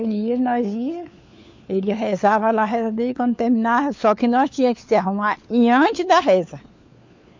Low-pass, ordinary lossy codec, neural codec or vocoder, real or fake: 7.2 kHz; MP3, 48 kbps; codec, 16 kHz, 4 kbps, FunCodec, trained on LibriTTS, 50 frames a second; fake